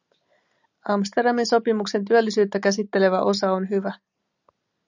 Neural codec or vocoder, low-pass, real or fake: none; 7.2 kHz; real